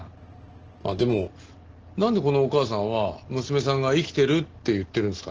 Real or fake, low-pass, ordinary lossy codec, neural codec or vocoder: real; 7.2 kHz; Opus, 16 kbps; none